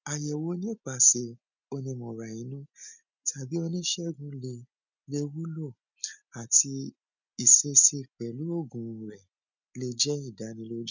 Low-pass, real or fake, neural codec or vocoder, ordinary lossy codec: 7.2 kHz; real; none; none